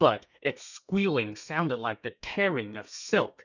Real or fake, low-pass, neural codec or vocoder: fake; 7.2 kHz; codec, 32 kHz, 1.9 kbps, SNAC